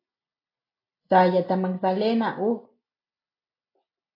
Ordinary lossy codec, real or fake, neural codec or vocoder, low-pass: AAC, 32 kbps; real; none; 5.4 kHz